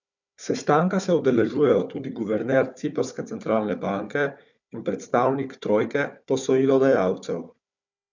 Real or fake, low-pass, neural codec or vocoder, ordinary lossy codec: fake; 7.2 kHz; codec, 16 kHz, 4 kbps, FunCodec, trained on Chinese and English, 50 frames a second; none